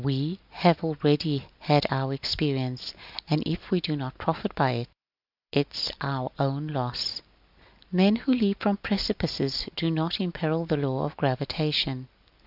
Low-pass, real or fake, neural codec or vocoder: 5.4 kHz; real; none